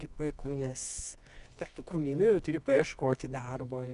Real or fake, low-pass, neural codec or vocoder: fake; 10.8 kHz; codec, 24 kHz, 0.9 kbps, WavTokenizer, medium music audio release